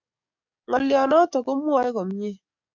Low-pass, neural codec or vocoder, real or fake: 7.2 kHz; codec, 44.1 kHz, 7.8 kbps, DAC; fake